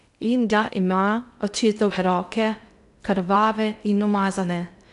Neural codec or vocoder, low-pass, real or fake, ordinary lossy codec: codec, 16 kHz in and 24 kHz out, 0.6 kbps, FocalCodec, streaming, 2048 codes; 10.8 kHz; fake; AAC, 96 kbps